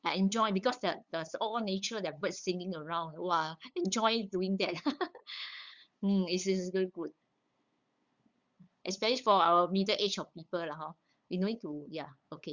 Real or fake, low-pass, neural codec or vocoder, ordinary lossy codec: fake; 7.2 kHz; codec, 16 kHz, 8 kbps, FunCodec, trained on LibriTTS, 25 frames a second; Opus, 64 kbps